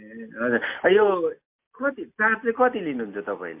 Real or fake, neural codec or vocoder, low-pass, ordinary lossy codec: real; none; 3.6 kHz; MP3, 32 kbps